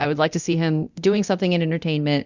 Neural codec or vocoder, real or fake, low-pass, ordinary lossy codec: codec, 24 kHz, 0.9 kbps, DualCodec; fake; 7.2 kHz; Opus, 64 kbps